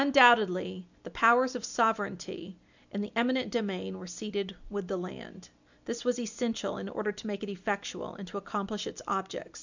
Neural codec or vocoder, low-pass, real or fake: none; 7.2 kHz; real